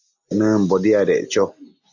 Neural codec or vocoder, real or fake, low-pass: none; real; 7.2 kHz